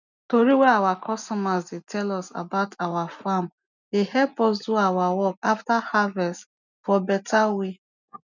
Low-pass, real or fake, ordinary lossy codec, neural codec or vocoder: 7.2 kHz; real; none; none